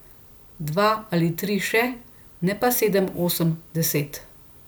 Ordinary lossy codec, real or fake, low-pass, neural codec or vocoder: none; real; none; none